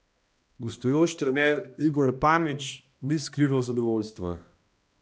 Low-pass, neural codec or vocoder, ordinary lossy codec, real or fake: none; codec, 16 kHz, 1 kbps, X-Codec, HuBERT features, trained on balanced general audio; none; fake